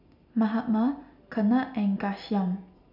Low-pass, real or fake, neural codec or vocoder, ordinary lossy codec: 5.4 kHz; real; none; none